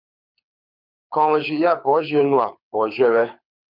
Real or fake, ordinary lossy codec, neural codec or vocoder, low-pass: fake; MP3, 48 kbps; codec, 24 kHz, 6 kbps, HILCodec; 5.4 kHz